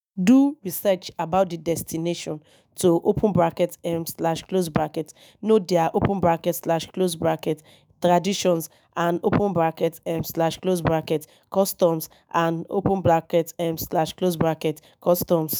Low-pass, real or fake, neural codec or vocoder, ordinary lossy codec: none; fake; autoencoder, 48 kHz, 128 numbers a frame, DAC-VAE, trained on Japanese speech; none